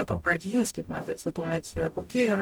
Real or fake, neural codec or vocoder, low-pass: fake; codec, 44.1 kHz, 0.9 kbps, DAC; 19.8 kHz